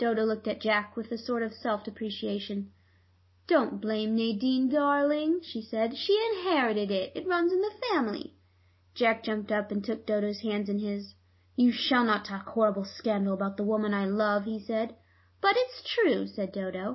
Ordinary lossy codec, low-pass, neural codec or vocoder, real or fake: MP3, 24 kbps; 7.2 kHz; none; real